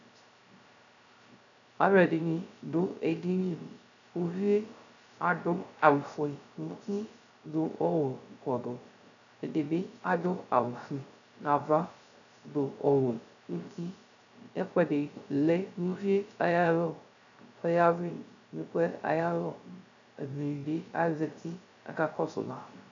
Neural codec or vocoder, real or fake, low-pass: codec, 16 kHz, 0.3 kbps, FocalCodec; fake; 7.2 kHz